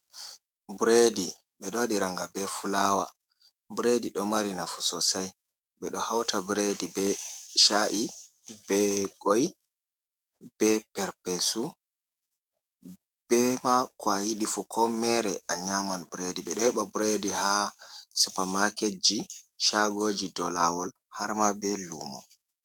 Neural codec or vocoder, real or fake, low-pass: codec, 44.1 kHz, 7.8 kbps, DAC; fake; 19.8 kHz